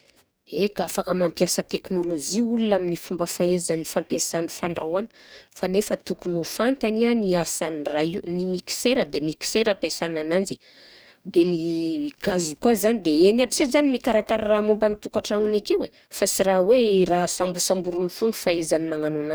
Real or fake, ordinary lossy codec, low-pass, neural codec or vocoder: fake; none; none; codec, 44.1 kHz, 2.6 kbps, DAC